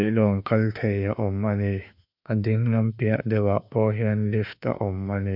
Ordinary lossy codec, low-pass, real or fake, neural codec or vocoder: none; 5.4 kHz; fake; autoencoder, 48 kHz, 32 numbers a frame, DAC-VAE, trained on Japanese speech